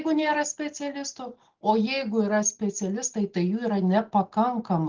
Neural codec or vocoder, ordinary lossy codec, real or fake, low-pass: none; Opus, 16 kbps; real; 7.2 kHz